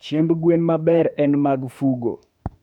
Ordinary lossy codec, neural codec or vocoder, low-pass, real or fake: none; autoencoder, 48 kHz, 32 numbers a frame, DAC-VAE, trained on Japanese speech; 19.8 kHz; fake